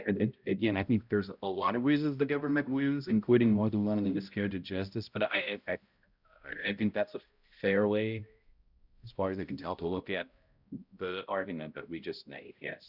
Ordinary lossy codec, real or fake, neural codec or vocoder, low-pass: Opus, 64 kbps; fake; codec, 16 kHz, 0.5 kbps, X-Codec, HuBERT features, trained on balanced general audio; 5.4 kHz